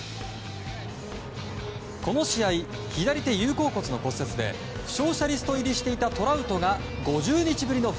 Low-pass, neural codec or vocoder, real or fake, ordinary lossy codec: none; none; real; none